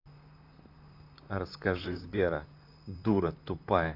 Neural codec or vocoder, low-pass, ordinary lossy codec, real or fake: vocoder, 44.1 kHz, 128 mel bands, Pupu-Vocoder; 5.4 kHz; none; fake